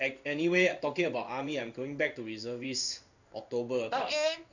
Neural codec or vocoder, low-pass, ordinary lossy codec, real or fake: codec, 16 kHz in and 24 kHz out, 1 kbps, XY-Tokenizer; 7.2 kHz; none; fake